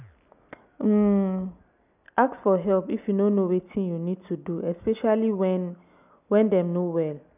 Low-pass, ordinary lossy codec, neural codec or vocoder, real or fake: 3.6 kHz; none; none; real